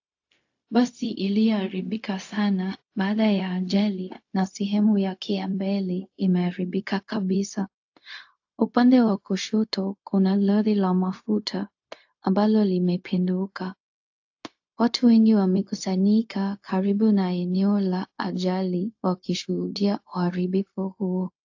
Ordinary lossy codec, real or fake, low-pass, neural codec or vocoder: AAC, 48 kbps; fake; 7.2 kHz; codec, 16 kHz, 0.4 kbps, LongCat-Audio-Codec